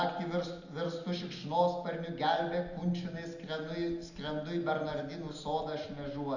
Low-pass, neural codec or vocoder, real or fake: 7.2 kHz; none; real